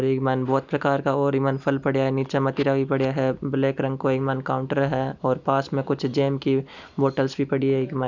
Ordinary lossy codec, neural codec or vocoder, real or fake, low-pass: none; none; real; 7.2 kHz